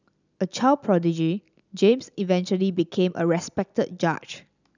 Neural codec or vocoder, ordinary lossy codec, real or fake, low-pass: none; none; real; 7.2 kHz